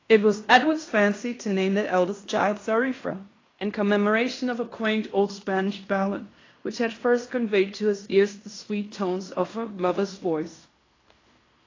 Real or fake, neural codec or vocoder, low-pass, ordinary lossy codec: fake; codec, 16 kHz in and 24 kHz out, 0.9 kbps, LongCat-Audio-Codec, fine tuned four codebook decoder; 7.2 kHz; AAC, 32 kbps